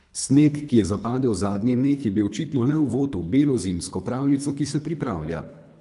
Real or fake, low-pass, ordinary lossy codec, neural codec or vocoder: fake; 10.8 kHz; none; codec, 24 kHz, 3 kbps, HILCodec